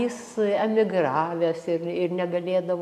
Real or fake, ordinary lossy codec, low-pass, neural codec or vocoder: real; AAC, 96 kbps; 14.4 kHz; none